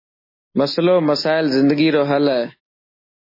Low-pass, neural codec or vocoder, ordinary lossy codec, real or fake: 5.4 kHz; none; MP3, 24 kbps; real